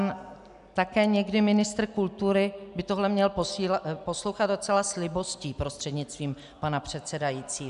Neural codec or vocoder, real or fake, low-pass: none; real; 10.8 kHz